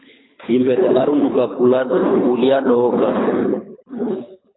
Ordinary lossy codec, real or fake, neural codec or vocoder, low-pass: AAC, 16 kbps; fake; codec, 24 kHz, 3 kbps, HILCodec; 7.2 kHz